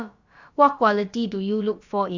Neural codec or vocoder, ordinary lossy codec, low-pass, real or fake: codec, 16 kHz, about 1 kbps, DyCAST, with the encoder's durations; Opus, 64 kbps; 7.2 kHz; fake